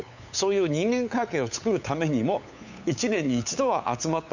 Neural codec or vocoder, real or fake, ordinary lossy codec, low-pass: codec, 16 kHz, 8 kbps, FunCodec, trained on LibriTTS, 25 frames a second; fake; none; 7.2 kHz